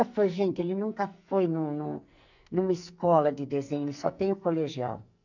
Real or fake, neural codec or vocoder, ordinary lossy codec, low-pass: fake; codec, 44.1 kHz, 2.6 kbps, SNAC; none; 7.2 kHz